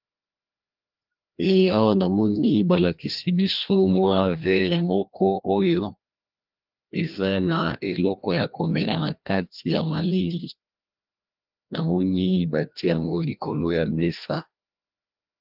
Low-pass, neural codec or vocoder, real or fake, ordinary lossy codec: 5.4 kHz; codec, 16 kHz, 1 kbps, FreqCodec, larger model; fake; Opus, 24 kbps